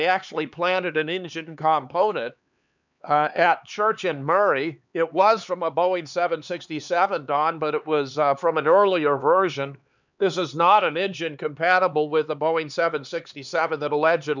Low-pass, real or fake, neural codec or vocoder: 7.2 kHz; fake; codec, 16 kHz, 4 kbps, X-Codec, HuBERT features, trained on LibriSpeech